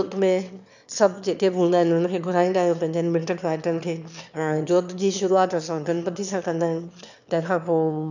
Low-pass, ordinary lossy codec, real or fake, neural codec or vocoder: 7.2 kHz; none; fake; autoencoder, 22.05 kHz, a latent of 192 numbers a frame, VITS, trained on one speaker